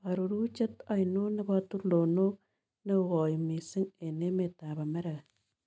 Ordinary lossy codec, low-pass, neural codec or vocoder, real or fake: none; none; none; real